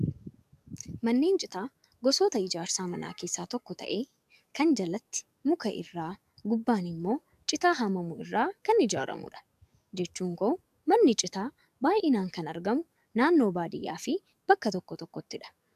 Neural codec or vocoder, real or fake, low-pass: codec, 44.1 kHz, 7.8 kbps, DAC; fake; 14.4 kHz